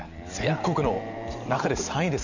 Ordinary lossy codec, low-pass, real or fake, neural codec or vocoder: none; 7.2 kHz; fake; vocoder, 44.1 kHz, 128 mel bands every 512 samples, BigVGAN v2